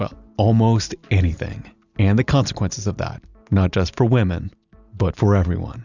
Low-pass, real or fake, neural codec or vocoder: 7.2 kHz; real; none